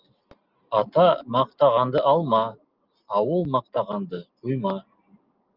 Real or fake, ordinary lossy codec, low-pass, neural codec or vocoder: real; Opus, 24 kbps; 5.4 kHz; none